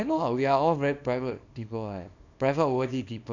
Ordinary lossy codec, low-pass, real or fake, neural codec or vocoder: none; 7.2 kHz; fake; codec, 24 kHz, 0.9 kbps, WavTokenizer, small release